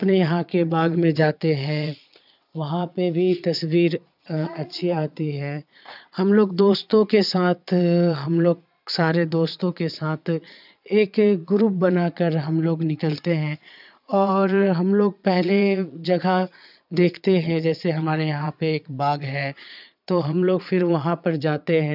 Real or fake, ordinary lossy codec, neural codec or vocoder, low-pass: fake; none; vocoder, 22.05 kHz, 80 mel bands, Vocos; 5.4 kHz